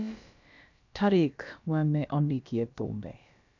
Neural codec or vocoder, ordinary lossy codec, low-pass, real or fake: codec, 16 kHz, about 1 kbps, DyCAST, with the encoder's durations; none; 7.2 kHz; fake